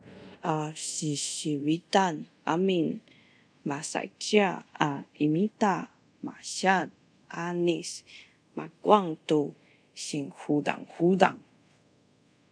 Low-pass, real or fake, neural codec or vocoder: 9.9 kHz; fake; codec, 24 kHz, 0.5 kbps, DualCodec